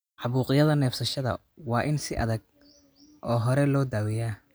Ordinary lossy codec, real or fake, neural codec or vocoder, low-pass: none; real; none; none